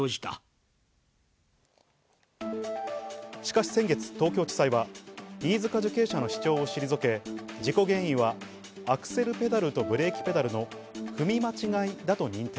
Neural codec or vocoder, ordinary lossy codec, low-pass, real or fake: none; none; none; real